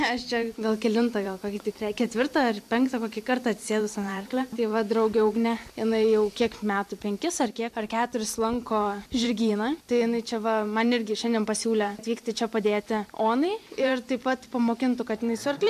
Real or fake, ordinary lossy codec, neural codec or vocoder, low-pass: fake; MP3, 64 kbps; vocoder, 44.1 kHz, 128 mel bands every 512 samples, BigVGAN v2; 14.4 kHz